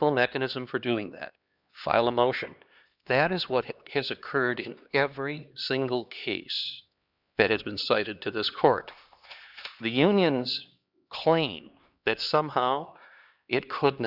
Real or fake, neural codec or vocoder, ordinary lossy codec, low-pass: fake; codec, 16 kHz, 2 kbps, X-Codec, HuBERT features, trained on LibriSpeech; Opus, 64 kbps; 5.4 kHz